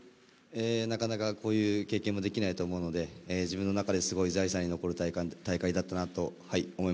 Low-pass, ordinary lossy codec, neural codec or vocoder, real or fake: none; none; none; real